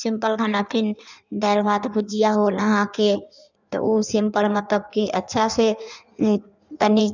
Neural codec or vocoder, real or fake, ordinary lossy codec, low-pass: codec, 16 kHz in and 24 kHz out, 1.1 kbps, FireRedTTS-2 codec; fake; none; 7.2 kHz